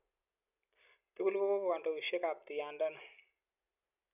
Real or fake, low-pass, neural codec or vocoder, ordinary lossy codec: real; 3.6 kHz; none; none